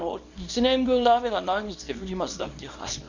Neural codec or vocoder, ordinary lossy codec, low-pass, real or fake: codec, 24 kHz, 0.9 kbps, WavTokenizer, small release; none; 7.2 kHz; fake